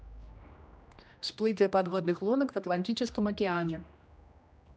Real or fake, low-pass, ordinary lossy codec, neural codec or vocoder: fake; none; none; codec, 16 kHz, 1 kbps, X-Codec, HuBERT features, trained on general audio